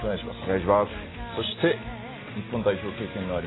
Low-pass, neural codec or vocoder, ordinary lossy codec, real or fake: 7.2 kHz; none; AAC, 16 kbps; real